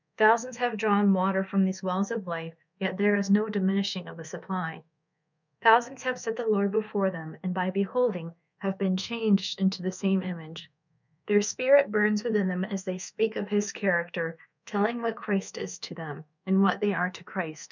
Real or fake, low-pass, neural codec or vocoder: fake; 7.2 kHz; codec, 24 kHz, 1.2 kbps, DualCodec